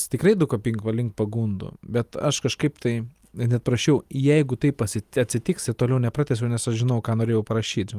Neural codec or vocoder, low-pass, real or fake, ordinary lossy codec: none; 14.4 kHz; real; Opus, 32 kbps